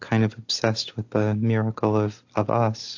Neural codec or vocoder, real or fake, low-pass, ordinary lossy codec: none; real; 7.2 kHz; AAC, 48 kbps